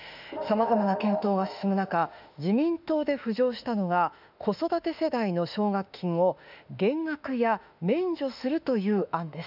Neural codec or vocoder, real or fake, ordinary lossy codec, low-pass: autoencoder, 48 kHz, 32 numbers a frame, DAC-VAE, trained on Japanese speech; fake; none; 5.4 kHz